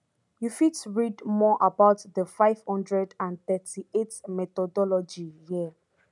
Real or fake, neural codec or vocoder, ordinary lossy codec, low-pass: real; none; none; 10.8 kHz